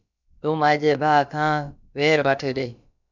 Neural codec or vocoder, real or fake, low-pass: codec, 16 kHz, about 1 kbps, DyCAST, with the encoder's durations; fake; 7.2 kHz